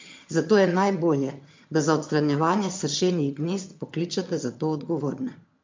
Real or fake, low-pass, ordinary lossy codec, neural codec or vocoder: fake; 7.2 kHz; MP3, 48 kbps; vocoder, 22.05 kHz, 80 mel bands, HiFi-GAN